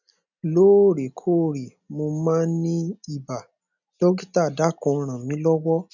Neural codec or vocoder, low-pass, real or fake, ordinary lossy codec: none; 7.2 kHz; real; none